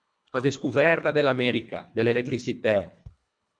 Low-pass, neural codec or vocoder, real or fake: 9.9 kHz; codec, 24 kHz, 1.5 kbps, HILCodec; fake